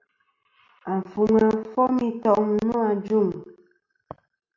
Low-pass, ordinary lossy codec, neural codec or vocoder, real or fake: 7.2 kHz; MP3, 64 kbps; none; real